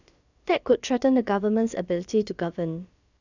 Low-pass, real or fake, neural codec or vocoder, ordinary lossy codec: 7.2 kHz; fake; codec, 16 kHz, about 1 kbps, DyCAST, with the encoder's durations; none